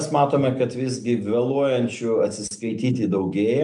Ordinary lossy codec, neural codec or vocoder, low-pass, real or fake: MP3, 96 kbps; none; 9.9 kHz; real